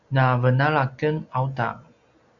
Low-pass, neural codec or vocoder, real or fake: 7.2 kHz; none; real